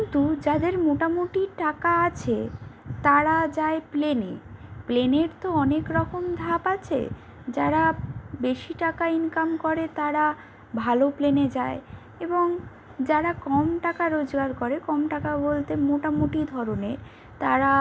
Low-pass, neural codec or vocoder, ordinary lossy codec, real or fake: none; none; none; real